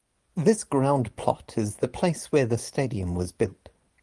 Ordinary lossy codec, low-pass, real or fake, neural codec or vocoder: Opus, 24 kbps; 10.8 kHz; fake; vocoder, 24 kHz, 100 mel bands, Vocos